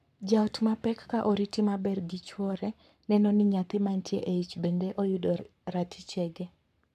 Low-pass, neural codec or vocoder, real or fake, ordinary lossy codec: 14.4 kHz; codec, 44.1 kHz, 7.8 kbps, Pupu-Codec; fake; none